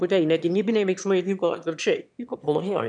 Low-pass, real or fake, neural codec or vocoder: 9.9 kHz; fake; autoencoder, 22.05 kHz, a latent of 192 numbers a frame, VITS, trained on one speaker